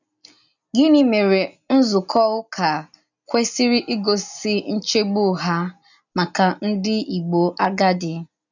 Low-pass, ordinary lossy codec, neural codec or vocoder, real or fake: 7.2 kHz; none; none; real